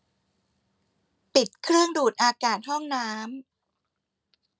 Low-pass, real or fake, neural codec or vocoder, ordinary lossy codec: none; real; none; none